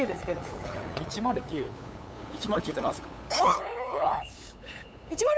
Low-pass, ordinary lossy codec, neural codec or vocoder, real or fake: none; none; codec, 16 kHz, 8 kbps, FunCodec, trained on LibriTTS, 25 frames a second; fake